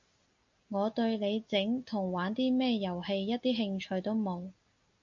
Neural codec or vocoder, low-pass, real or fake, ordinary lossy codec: none; 7.2 kHz; real; AAC, 64 kbps